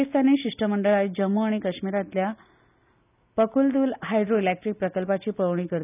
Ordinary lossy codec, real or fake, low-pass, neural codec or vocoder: none; real; 3.6 kHz; none